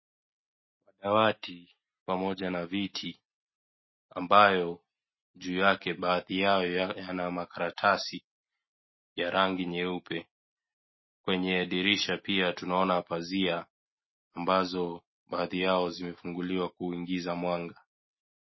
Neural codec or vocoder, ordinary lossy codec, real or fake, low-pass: none; MP3, 24 kbps; real; 7.2 kHz